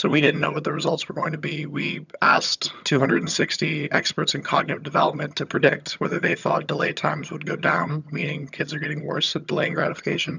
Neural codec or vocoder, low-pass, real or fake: vocoder, 22.05 kHz, 80 mel bands, HiFi-GAN; 7.2 kHz; fake